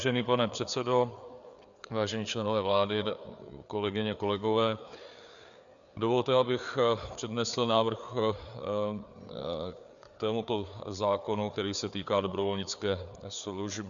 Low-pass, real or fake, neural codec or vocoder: 7.2 kHz; fake; codec, 16 kHz, 4 kbps, FreqCodec, larger model